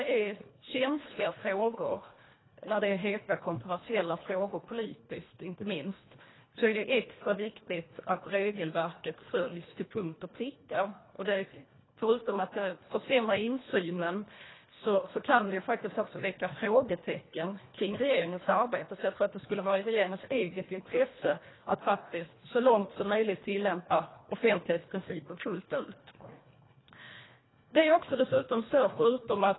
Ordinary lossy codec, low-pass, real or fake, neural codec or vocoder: AAC, 16 kbps; 7.2 kHz; fake; codec, 24 kHz, 1.5 kbps, HILCodec